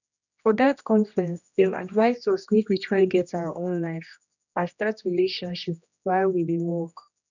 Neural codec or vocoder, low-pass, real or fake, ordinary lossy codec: codec, 16 kHz, 1 kbps, X-Codec, HuBERT features, trained on general audio; 7.2 kHz; fake; none